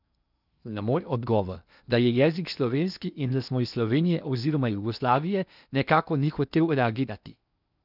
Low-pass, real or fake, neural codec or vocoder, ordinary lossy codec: 5.4 kHz; fake; codec, 16 kHz in and 24 kHz out, 0.8 kbps, FocalCodec, streaming, 65536 codes; none